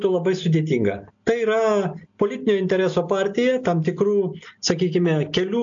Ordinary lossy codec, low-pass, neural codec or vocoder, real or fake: AAC, 64 kbps; 7.2 kHz; none; real